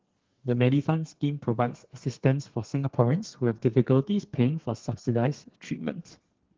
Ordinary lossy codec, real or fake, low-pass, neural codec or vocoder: Opus, 16 kbps; fake; 7.2 kHz; codec, 32 kHz, 1.9 kbps, SNAC